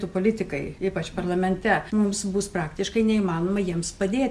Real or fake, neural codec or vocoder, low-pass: real; none; 14.4 kHz